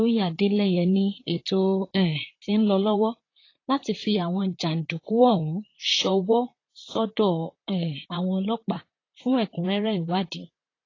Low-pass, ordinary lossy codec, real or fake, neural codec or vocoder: 7.2 kHz; AAC, 32 kbps; fake; vocoder, 44.1 kHz, 128 mel bands, Pupu-Vocoder